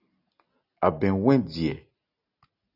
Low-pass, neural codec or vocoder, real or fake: 5.4 kHz; none; real